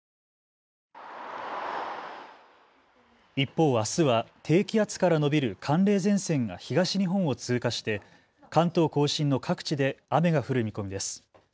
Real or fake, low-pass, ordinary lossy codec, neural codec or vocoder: real; none; none; none